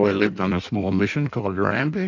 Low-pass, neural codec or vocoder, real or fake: 7.2 kHz; codec, 16 kHz in and 24 kHz out, 1.1 kbps, FireRedTTS-2 codec; fake